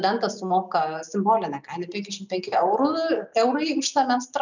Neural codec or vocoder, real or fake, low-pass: none; real; 7.2 kHz